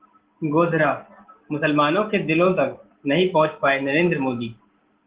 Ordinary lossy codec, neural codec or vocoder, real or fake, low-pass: Opus, 16 kbps; none; real; 3.6 kHz